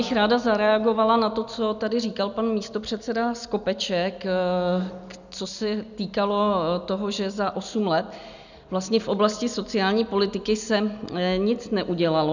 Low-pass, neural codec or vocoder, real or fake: 7.2 kHz; none; real